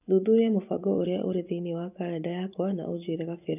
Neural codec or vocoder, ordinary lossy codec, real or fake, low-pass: vocoder, 24 kHz, 100 mel bands, Vocos; none; fake; 3.6 kHz